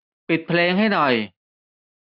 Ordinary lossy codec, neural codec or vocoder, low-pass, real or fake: none; none; 5.4 kHz; real